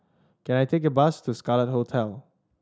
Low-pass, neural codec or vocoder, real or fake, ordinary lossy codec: none; none; real; none